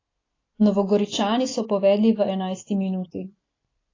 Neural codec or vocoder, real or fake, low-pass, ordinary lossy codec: none; real; 7.2 kHz; AAC, 32 kbps